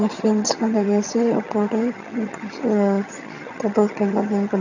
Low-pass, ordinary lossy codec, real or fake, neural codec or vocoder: 7.2 kHz; none; fake; vocoder, 22.05 kHz, 80 mel bands, HiFi-GAN